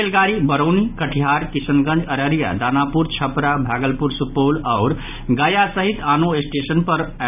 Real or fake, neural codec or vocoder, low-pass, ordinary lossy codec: real; none; 3.6 kHz; none